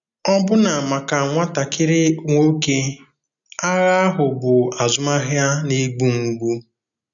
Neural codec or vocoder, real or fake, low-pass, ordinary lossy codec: none; real; 7.2 kHz; none